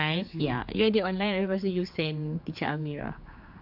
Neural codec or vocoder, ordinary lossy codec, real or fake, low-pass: codec, 16 kHz, 4 kbps, X-Codec, HuBERT features, trained on general audio; AAC, 48 kbps; fake; 5.4 kHz